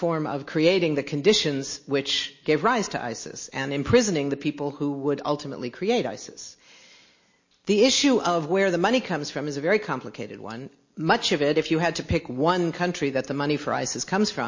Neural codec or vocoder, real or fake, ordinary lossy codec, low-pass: none; real; MP3, 32 kbps; 7.2 kHz